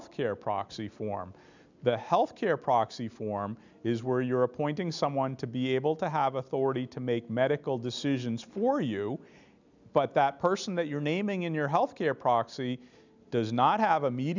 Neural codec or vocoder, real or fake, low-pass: none; real; 7.2 kHz